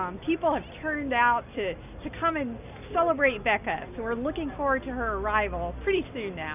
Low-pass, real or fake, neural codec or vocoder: 3.6 kHz; real; none